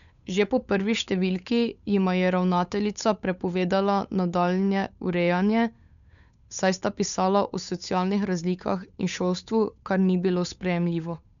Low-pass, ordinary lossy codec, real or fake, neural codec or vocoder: 7.2 kHz; none; fake; codec, 16 kHz, 8 kbps, FunCodec, trained on Chinese and English, 25 frames a second